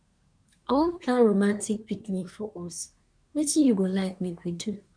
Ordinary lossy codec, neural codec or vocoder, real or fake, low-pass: none; codec, 24 kHz, 1 kbps, SNAC; fake; 9.9 kHz